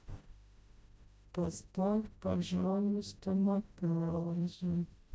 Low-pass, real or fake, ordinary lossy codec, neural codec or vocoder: none; fake; none; codec, 16 kHz, 0.5 kbps, FreqCodec, smaller model